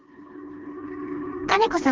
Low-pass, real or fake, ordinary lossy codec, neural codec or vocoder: 7.2 kHz; fake; Opus, 16 kbps; codec, 16 kHz, 2 kbps, FreqCodec, smaller model